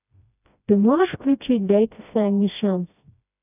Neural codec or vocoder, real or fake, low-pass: codec, 16 kHz, 1 kbps, FreqCodec, smaller model; fake; 3.6 kHz